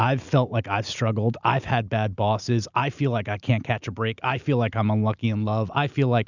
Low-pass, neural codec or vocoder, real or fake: 7.2 kHz; none; real